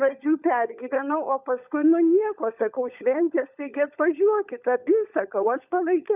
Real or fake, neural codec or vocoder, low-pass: fake; codec, 16 kHz, 16 kbps, FunCodec, trained on LibriTTS, 50 frames a second; 3.6 kHz